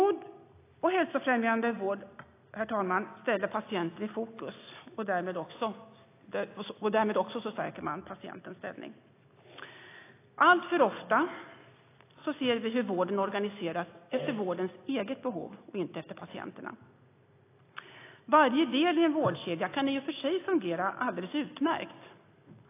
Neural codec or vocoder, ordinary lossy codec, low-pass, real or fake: none; AAC, 24 kbps; 3.6 kHz; real